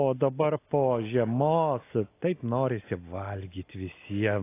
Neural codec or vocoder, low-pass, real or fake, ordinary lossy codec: none; 3.6 kHz; real; AAC, 24 kbps